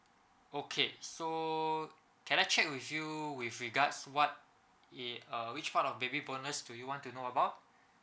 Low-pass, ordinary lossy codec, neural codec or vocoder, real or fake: none; none; none; real